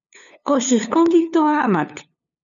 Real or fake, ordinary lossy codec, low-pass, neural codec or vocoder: fake; MP3, 96 kbps; 7.2 kHz; codec, 16 kHz, 2 kbps, FunCodec, trained on LibriTTS, 25 frames a second